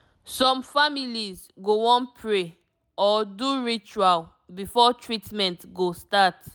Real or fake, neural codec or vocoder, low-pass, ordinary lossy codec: real; none; none; none